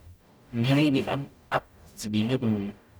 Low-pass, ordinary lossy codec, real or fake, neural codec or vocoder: none; none; fake; codec, 44.1 kHz, 0.9 kbps, DAC